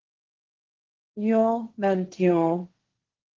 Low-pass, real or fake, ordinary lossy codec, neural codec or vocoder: 7.2 kHz; fake; Opus, 16 kbps; codec, 16 kHz, 1.1 kbps, Voila-Tokenizer